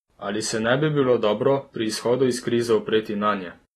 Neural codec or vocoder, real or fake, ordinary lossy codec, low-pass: none; real; AAC, 32 kbps; 19.8 kHz